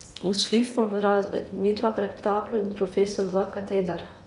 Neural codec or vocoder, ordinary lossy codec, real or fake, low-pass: codec, 16 kHz in and 24 kHz out, 0.8 kbps, FocalCodec, streaming, 65536 codes; none; fake; 10.8 kHz